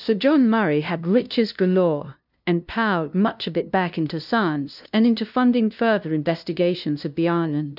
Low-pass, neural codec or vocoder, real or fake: 5.4 kHz; codec, 16 kHz, 0.5 kbps, FunCodec, trained on LibriTTS, 25 frames a second; fake